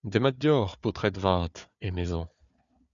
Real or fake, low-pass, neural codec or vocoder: fake; 7.2 kHz; codec, 16 kHz, 4 kbps, FunCodec, trained on Chinese and English, 50 frames a second